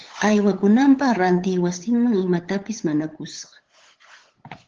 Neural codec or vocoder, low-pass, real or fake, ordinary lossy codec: codec, 16 kHz, 8 kbps, FunCodec, trained on LibriTTS, 25 frames a second; 7.2 kHz; fake; Opus, 16 kbps